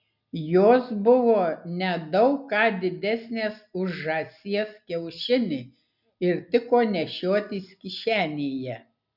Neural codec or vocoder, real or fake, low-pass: none; real; 5.4 kHz